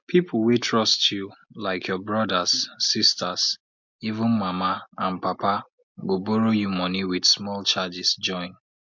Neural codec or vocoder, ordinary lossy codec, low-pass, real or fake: none; MP3, 64 kbps; 7.2 kHz; real